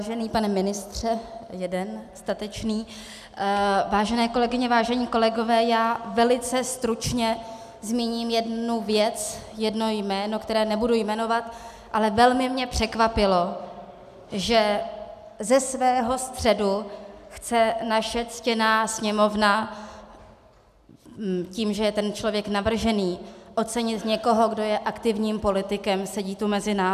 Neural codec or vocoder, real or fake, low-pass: none; real; 14.4 kHz